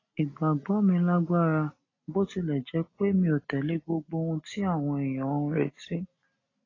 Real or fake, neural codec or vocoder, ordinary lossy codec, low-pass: real; none; AAC, 32 kbps; 7.2 kHz